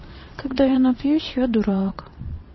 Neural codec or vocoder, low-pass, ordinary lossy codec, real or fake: vocoder, 22.05 kHz, 80 mel bands, WaveNeXt; 7.2 kHz; MP3, 24 kbps; fake